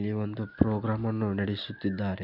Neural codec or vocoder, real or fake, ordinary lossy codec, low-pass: none; real; none; 5.4 kHz